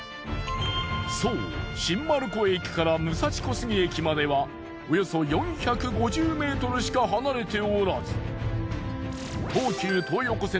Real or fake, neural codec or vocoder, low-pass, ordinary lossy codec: real; none; none; none